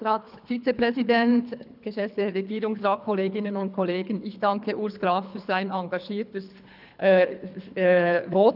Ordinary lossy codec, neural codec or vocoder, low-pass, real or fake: none; codec, 24 kHz, 3 kbps, HILCodec; 5.4 kHz; fake